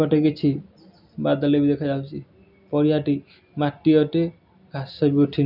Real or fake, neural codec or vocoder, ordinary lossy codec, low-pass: real; none; none; 5.4 kHz